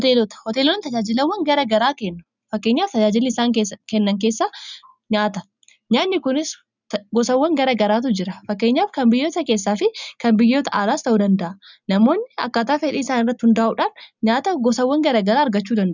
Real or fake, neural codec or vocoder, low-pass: real; none; 7.2 kHz